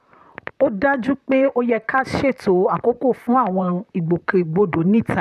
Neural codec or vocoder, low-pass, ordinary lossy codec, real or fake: vocoder, 44.1 kHz, 128 mel bands, Pupu-Vocoder; 14.4 kHz; none; fake